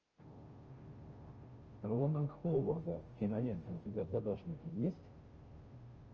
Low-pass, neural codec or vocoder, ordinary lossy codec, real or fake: 7.2 kHz; codec, 16 kHz, 0.5 kbps, FunCodec, trained on Chinese and English, 25 frames a second; Opus, 24 kbps; fake